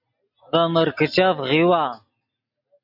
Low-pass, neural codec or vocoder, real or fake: 5.4 kHz; none; real